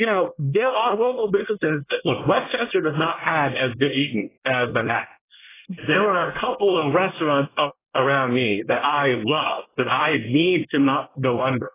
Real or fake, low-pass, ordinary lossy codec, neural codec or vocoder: fake; 3.6 kHz; AAC, 16 kbps; codec, 24 kHz, 1 kbps, SNAC